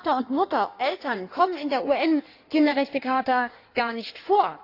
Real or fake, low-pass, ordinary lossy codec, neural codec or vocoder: fake; 5.4 kHz; AAC, 32 kbps; codec, 16 kHz in and 24 kHz out, 1.1 kbps, FireRedTTS-2 codec